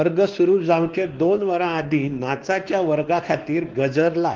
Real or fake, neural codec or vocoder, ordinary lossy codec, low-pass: fake; codec, 16 kHz, 2 kbps, X-Codec, WavLM features, trained on Multilingual LibriSpeech; Opus, 16 kbps; 7.2 kHz